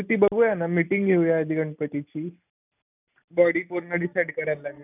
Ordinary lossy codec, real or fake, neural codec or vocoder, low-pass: none; real; none; 3.6 kHz